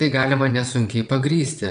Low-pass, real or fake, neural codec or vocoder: 9.9 kHz; fake; vocoder, 22.05 kHz, 80 mel bands, WaveNeXt